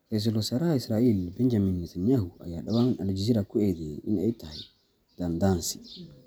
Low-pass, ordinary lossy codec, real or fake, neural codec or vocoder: none; none; real; none